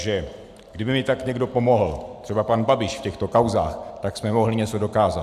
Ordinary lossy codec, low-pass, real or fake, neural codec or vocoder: AAC, 96 kbps; 14.4 kHz; real; none